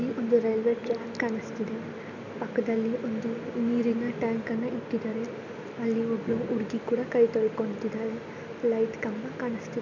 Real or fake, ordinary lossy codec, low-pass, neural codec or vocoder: real; none; 7.2 kHz; none